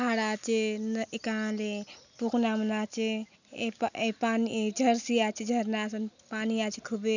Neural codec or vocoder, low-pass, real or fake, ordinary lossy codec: none; 7.2 kHz; real; none